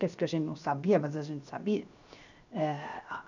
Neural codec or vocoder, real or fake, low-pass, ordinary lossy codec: codec, 16 kHz, 0.7 kbps, FocalCodec; fake; 7.2 kHz; none